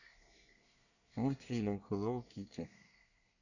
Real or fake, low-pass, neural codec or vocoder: fake; 7.2 kHz; codec, 24 kHz, 1 kbps, SNAC